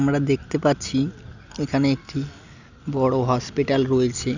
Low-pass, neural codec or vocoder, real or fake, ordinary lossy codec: 7.2 kHz; none; real; none